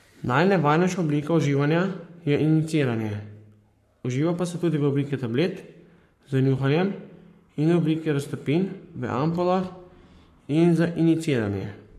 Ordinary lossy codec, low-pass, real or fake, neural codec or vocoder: MP3, 64 kbps; 14.4 kHz; fake; codec, 44.1 kHz, 7.8 kbps, Pupu-Codec